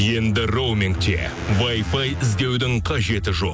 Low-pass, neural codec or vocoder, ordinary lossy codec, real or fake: none; none; none; real